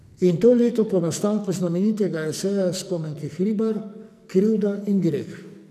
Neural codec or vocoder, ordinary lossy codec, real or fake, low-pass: codec, 44.1 kHz, 2.6 kbps, SNAC; none; fake; 14.4 kHz